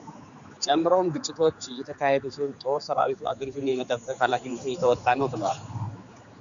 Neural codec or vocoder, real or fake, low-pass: codec, 16 kHz, 4 kbps, X-Codec, HuBERT features, trained on general audio; fake; 7.2 kHz